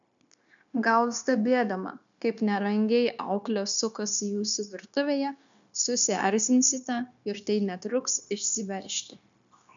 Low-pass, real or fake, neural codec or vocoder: 7.2 kHz; fake; codec, 16 kHz, 0.9 kbps, LongCat-Audio-Codec